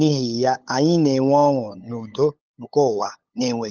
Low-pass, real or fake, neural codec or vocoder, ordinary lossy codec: 7.2 kHz; fake; codec, 16 kHz, 8 kbps, FunCodec, trained on LibriTTS, 25 frames a second; Opus, 32 kbps